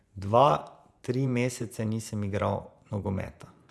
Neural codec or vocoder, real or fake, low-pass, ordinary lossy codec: vocoder, 24 kHz, 100 mel bands, Vocos; fake; none; none